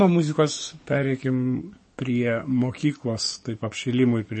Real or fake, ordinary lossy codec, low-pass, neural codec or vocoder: fake; MP3, 32 kbps; 9.9 kHz; codec, 44.1 kHz, 7.8 kbps, DAC